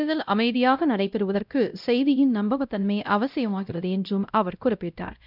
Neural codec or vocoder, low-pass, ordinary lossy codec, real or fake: codec, 16 kHz, 0.5 kbps, X-Codec, WavLM features, trained on Multilingual LibriSpeech; 5.4 kHz; none; fake